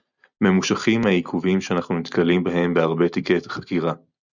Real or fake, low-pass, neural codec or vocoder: real; 7.2 kHz; none